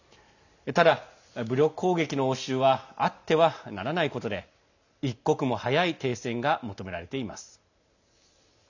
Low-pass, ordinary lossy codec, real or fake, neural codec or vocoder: 7.2 kHz; none; real; none